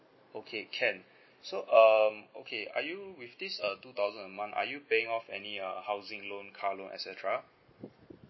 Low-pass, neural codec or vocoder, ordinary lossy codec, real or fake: 7.2 kHz; none; MP3, 24 kbps; real